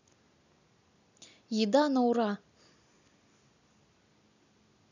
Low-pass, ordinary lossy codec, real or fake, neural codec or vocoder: 7.2 kHz; none; real; none